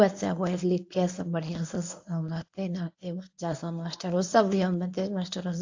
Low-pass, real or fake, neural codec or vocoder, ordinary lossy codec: 7.2 kHz; fake; codec, 24 kHz, 0.9 kbps, WavTokenizer, medium speech release version 1; AAC, 48 kbps